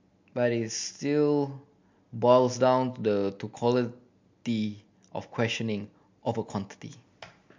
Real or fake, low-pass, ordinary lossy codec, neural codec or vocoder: real; 7.2 kHz; MP3, 48 kbps; none